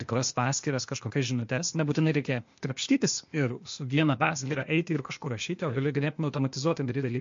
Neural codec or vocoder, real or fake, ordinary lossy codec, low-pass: codec, 16 kHz, 0.8 kbps, ZipCodec; fake; MP3, 48 kbps; 7.2 kHz